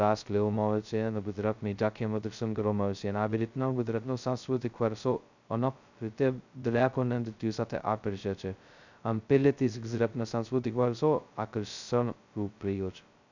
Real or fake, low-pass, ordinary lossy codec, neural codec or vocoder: fake; 7.2 kHz; none; codec, 16 kHz, 0.2 kbps, FocalCodec